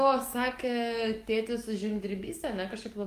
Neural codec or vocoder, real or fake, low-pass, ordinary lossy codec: codec, 44.1 kHz, 7.8 kbps, DAC; fake; 14.4 kHz; Opus, 32 kbps